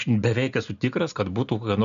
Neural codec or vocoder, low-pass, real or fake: none; 7.2 kHz; real